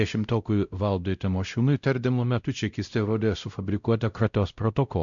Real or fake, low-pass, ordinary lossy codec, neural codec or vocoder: fake; 7.2 kHz; MP3, 96 kbps; codec, 16 kHz, 0.5 kbps, X-Codec, WavLM features, trained on Multilingual LibriSpeech